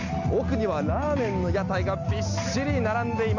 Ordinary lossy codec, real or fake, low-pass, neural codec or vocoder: none; real; 7.2 kHz; none